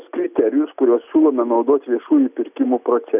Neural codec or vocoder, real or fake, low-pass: none; real; 3.6 kHz